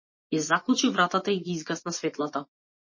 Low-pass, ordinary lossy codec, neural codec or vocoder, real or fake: 7.2 kHz; MP3, 32 kbps; vocoder, 24 kHz, 100 mel bands, Vocos; fake